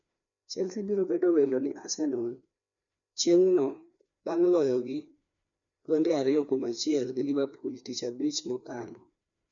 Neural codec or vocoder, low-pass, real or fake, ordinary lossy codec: codec, 16 kHz, 2 kbps, FreqCodec, larger model; 7.2 kHz; fake; none